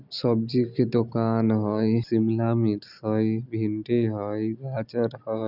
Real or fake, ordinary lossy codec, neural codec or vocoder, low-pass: real; none; none; 5.4 kHz